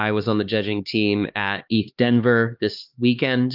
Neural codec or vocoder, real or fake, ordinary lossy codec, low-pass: codec, 16 kHz, 2 kbps, X-Codec, HuBERT features, trained on LibriSpeech; fake; Opus, 24 kbps; 5.4 kHz